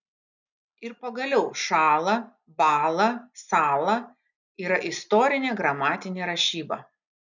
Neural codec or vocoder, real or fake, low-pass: none; real; 7.2 kHz